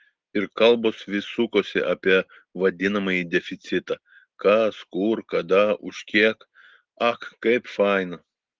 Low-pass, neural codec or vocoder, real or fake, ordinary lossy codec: 7.2 kHz; none; real; Opus, 16 kbps